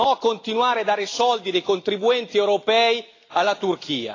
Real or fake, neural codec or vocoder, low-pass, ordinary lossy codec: real; none; 7.2 kHz; AAC, 32 kbps